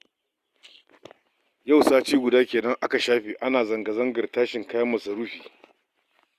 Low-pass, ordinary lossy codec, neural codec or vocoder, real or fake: 14.4 kHz; Opus, 64 kbps; none; real